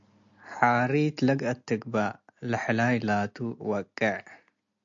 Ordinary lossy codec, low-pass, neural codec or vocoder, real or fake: AAC, 64 kbps; 7.2 kHz; none; real